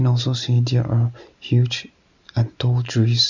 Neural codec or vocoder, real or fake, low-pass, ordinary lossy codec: none; real; 7.2 kHz; none